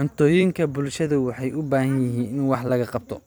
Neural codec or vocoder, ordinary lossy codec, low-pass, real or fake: vocoder, 44.1 kHz, 128 mel bands every 256 samples, BigVGAN v2; none; none; fake